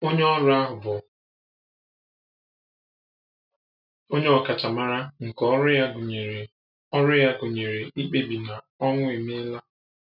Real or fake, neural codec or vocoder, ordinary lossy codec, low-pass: real; none; none; 5.4 kHz